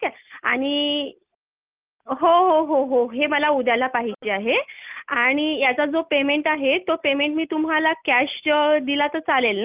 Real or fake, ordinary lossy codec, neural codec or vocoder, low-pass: real; Opus, 24 kbps; none; 3.6 kHz